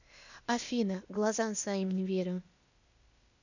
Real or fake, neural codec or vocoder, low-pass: fake; codec, 16 kHz, 0.8 kbps, ZipCodec; 7.2 kHz